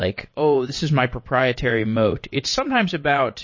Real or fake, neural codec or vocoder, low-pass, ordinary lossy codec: fake; vocoder, 22.05 kHz, 80 mel bands, WaveNeXt; 7.2 kHz; MP3, 32 kbps